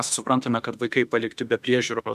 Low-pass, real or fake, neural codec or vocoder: 14.4 kHz; fake; autoencoder, 48 kHz, 32 numbers a frame, DAC-VAE, trained on Japanese speech